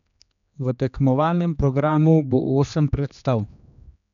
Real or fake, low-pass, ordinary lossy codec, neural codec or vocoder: fake; 7.2 kHz; none; codec, 16 kHz, 2 kbps, X-Codec, HuBERT features, trained on general audio